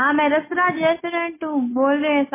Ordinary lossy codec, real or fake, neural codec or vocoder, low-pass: MP3, 16 kbps; real; none; 3.6 kHz